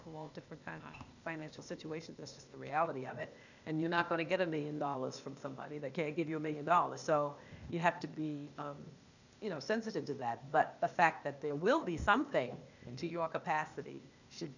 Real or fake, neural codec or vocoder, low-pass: fake; codec, 16 kHz, 0.8 kbps, ZipCodec; 7.2 kHz